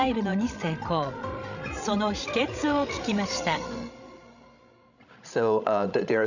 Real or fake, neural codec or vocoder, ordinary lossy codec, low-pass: fake; codec, 16 kHz, 16 kbps, FreqCodec, larger model; none; 7.2 kHz